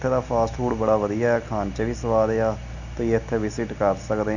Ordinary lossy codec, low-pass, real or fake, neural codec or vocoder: none; 7.2 kHz; real; none